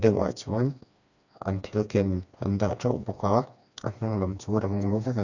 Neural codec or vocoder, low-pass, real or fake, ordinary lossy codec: codec, 16 kHz, 2 kbps, FreqCodec, smaller model; 7.2 kHz; fake; none